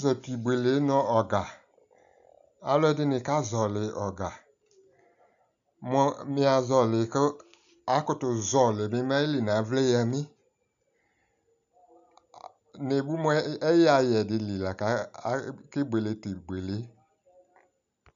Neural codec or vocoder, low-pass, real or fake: none; 7.2 kHz; real